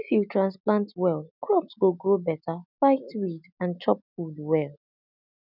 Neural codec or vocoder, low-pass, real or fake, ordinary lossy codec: none; 5.4 kHz; real; none